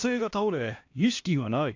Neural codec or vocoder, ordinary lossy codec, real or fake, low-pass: codec, 16 kHz, 1 kbps, X-Codec, HuBERT features, trained on LibriSpeech; AAC, 48 kbps; fake; 7.2 kHz